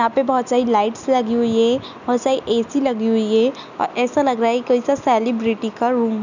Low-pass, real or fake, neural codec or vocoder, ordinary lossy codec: 7.2 kHz; real; none; none